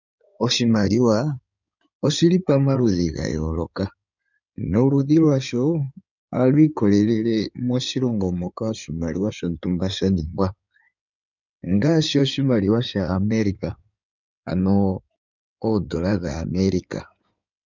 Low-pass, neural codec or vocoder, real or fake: 7.2 kHz; codec, 16 kHz in and 24 kHz out, 2.2 kbps, FireRedTTS-2 codec; fake